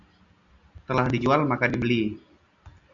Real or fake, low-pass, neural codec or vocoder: real; 7.2 kHz; none